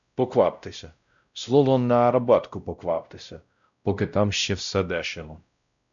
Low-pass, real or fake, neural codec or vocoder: 7.2 kHz; fake; codec, 16 kHz, 0.5 kbps, X-Codec, WavLM features, trained on Multilingual LibriSpeech